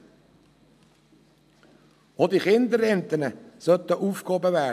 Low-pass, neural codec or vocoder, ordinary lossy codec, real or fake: 14.4 kHz; none; none; real